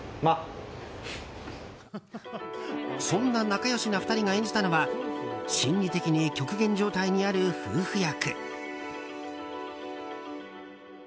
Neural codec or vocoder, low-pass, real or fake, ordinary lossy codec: none; none; real; none